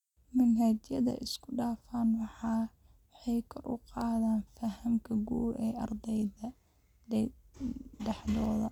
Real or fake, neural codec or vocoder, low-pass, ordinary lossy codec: real; none; 19.8 kHz; none